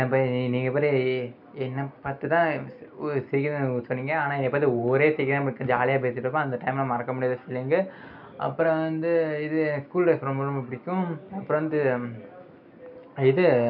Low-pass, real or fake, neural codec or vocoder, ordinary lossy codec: 5.4 kHz; real; none; none